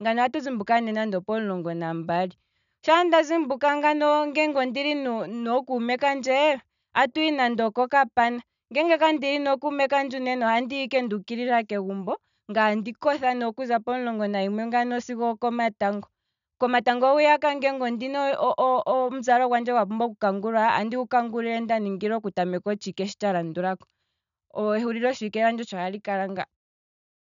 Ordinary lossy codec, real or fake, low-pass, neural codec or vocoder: none; real; 7.2 kHz; none